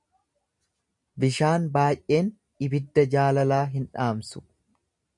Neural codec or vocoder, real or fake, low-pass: none; real; 10.8 kHz